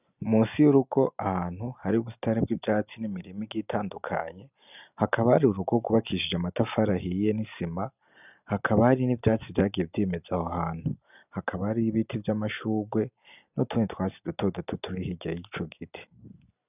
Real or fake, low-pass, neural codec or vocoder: real; 3.6 kHz; none